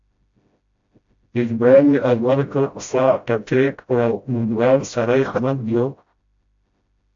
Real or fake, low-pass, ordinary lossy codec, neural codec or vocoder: fake; 7.2 kHz; AAC, 64 kbps; codec, 16 kHz, 0.5 kbps, FreqCodec, smaller model